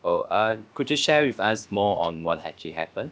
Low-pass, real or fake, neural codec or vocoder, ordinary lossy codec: none; fake; codec, 16 kHz, about 1 kbps, DyCAST, with the encoder's durations; none